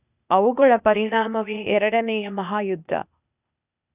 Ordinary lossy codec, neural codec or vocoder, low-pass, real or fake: none; codec, 16 kHz, 0.8 kbps, ZipCodec; 3.6 kHz; fake